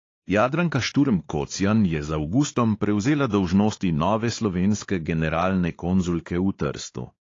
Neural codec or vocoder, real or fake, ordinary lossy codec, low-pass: codec, 16 kHz, 4 kbps, X-Codec, WavLM features, trained on Multilingual LibriSpeech; fake; AAC, 32 kbps; 7.2 kHz